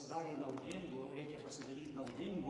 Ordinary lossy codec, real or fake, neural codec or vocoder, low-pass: AAC, 32 kbps; fake; codec, 44.1 kHz, 2.6 kbps, SNAC; 10.8 kHz